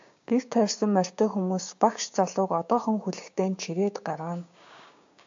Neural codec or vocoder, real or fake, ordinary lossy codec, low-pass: codec, 16 kHz, 6 kbps, DAC; fake; AAC, 64 kbps; 7.2 kHz